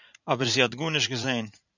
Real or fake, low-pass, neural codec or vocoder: fake; 7.2 kHz; vocoder, 44.1 kHz, 80 mel bands, Vocos